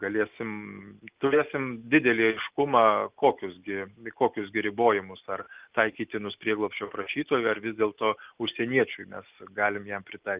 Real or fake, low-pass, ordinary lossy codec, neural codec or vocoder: real; 3.6 kHz; Opus, 24 kbps; none